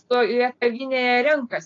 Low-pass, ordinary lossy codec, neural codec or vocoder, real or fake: 7.2 kHz; AAC, 32 kbps; none; real